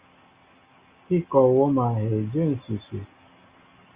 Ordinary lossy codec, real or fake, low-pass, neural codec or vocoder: Opus, 64 kbps; real; 3.6 kHz; none